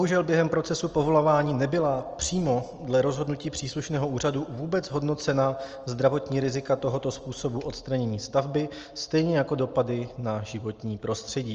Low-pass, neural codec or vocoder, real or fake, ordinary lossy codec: 7.2 kHz; none; real; Opus, 24 kbps